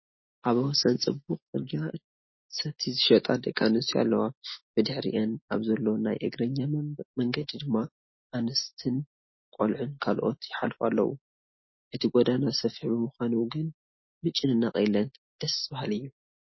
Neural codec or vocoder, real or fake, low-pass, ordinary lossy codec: none; real; 7.2 kHz; MP3, 24 kbps